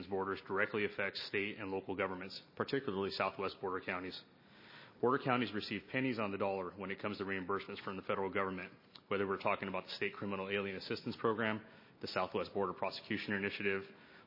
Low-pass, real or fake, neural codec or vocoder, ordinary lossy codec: 5.4 kHz; real; none; MP3, 24 kbps